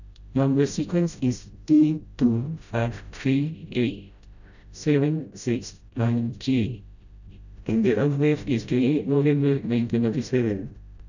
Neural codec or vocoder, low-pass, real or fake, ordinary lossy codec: codec, 16 kHz, 0.5 kbps, FreqCodec, smaller model; 7.2 kHz; fake; none